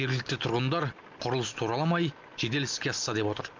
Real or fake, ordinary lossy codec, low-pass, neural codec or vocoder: real; Opus, 24 kbps; 7.2 kHz; none